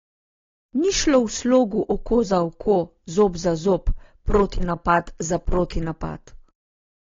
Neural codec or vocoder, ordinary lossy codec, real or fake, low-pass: none; AAC, 32 kbps; real; 7.2 kHz